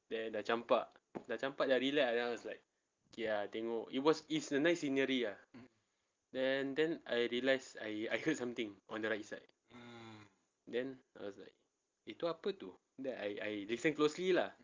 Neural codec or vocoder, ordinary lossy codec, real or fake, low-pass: none; Opus, 32 kbps; real; 7.2 kHz